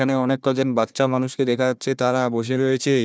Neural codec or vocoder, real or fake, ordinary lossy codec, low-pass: codec, 16 kHz, 1 kbps, FunCodec, trained on Chinese and English, 50 frames a second; fake; none; none